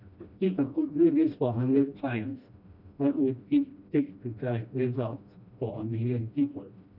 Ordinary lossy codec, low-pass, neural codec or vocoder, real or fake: none; 5.4 kHz; codec, 16 kHz, 1 kbps, FreqCodec, smaller model; fake